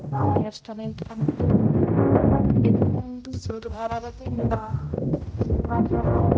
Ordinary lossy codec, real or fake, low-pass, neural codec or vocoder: none; fake; none; codec, 16 kHz, 0.5 kbps, X-Codec, HuBERT features, trained on general audio